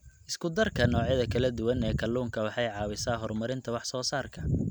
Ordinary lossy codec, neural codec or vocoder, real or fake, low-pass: none; none; real; none